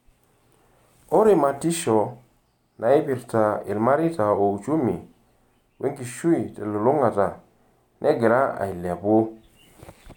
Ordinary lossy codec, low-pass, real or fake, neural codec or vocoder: none; 19.8 kHz; real; none